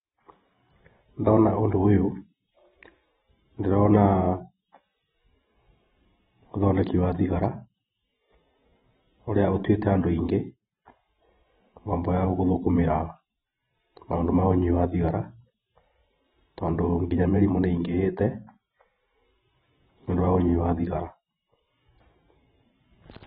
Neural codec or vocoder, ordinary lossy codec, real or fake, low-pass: vocoder, 44.1 kHz, 128 mel bands every 512 samples, BigVGAN v2; AAC, 16 kbps; fake; 19.8 kHz